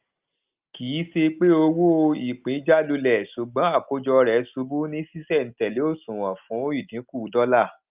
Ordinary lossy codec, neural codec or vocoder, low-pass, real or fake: Opus, 24 kbps; none; 3.6 kHz; real